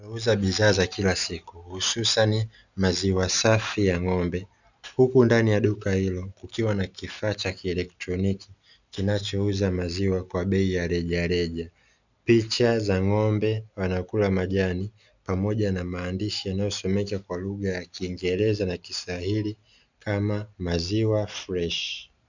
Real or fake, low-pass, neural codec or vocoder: real; 7.2 kHz; none